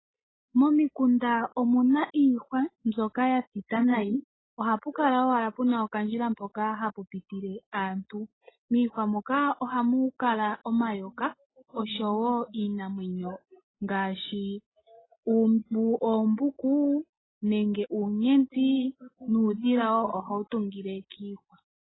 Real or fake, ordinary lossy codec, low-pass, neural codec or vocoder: real; AAC, 16 kbps; 7.2 kHz; none